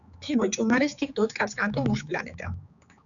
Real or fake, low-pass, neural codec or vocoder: fake; 7.2 kHz; codec, 16 kHz, 4 kbps, X-Codec, HuBERT features, trained on general audio